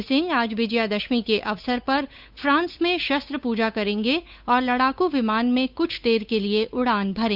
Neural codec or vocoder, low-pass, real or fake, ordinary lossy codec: codec, 16 kHz, 8 kbps, FunCodec, trained on Chinese and English, 25 frames a second; 5.4 kHz; fake; Opus, 64 kbps